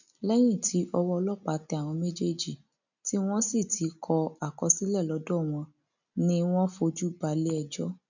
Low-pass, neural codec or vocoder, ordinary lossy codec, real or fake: 7.2 kHz; none; none; real